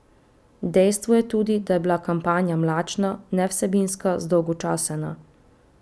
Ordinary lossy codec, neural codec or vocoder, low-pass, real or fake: none; none; none; real